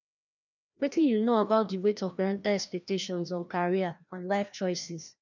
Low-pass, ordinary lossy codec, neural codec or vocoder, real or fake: 7.2 kHz; none; codec, 16 kHz, 1 kbps, FreqCodec, larger model; fake